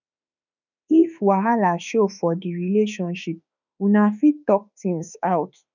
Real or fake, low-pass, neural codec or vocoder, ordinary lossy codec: fake; 7.2 kHz; autoencoder, 48 kHz, 32 numbers a frame, DAC-VAE, trained on Japanese speech; none